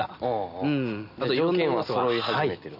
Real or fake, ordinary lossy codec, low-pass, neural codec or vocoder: real; none; 5.4 kHz; none